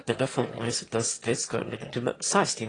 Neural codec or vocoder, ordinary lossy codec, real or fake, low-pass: autoencoder, 22.05 kHz, a latent of 192 numbers a frame, VITS, trained on one speaker; AAC, 32 kbps; fake; 9.9 kHz